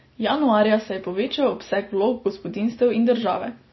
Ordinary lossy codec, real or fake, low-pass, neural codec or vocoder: MP3, 24 kbps; real; 7.2 kHz; none